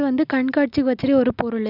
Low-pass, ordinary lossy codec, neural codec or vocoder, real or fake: 5.4 kHz; none; none; real